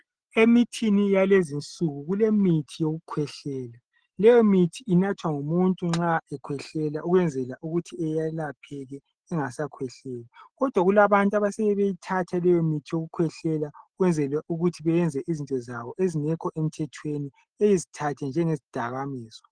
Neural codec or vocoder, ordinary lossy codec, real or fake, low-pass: none; Opus, 16 kbps; real; 9.9 kHz